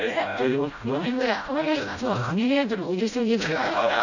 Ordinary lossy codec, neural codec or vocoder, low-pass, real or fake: none; codec, 16 kHz, 0.5 kbps, FreqCodec, smaller model; 7.2 kHz; fake